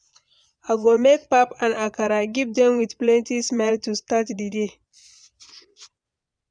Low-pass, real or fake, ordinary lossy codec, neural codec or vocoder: 9.9 kHz; fake; none; vocoder, 22.05 kHz, 80 mel bands, Vocos